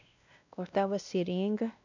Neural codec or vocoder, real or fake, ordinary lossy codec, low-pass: codec, 16 kHz, 1 kbps, X-Codec, WavLM features, trained on Multilingual LibriSpeech; fake; none; 7.2 kHz